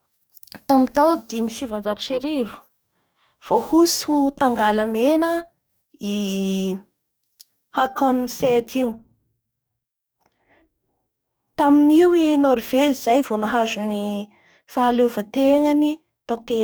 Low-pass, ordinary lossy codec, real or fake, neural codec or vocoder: none; none; fake; codec, 44.1 kHz, 2.6 kbps, DAC